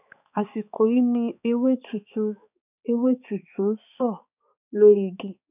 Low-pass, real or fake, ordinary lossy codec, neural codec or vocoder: 3.6 kHz; fake; none; codec, 16 kHz, 4 kbps, X-Codec, HuBERT features, trained on balanced general audio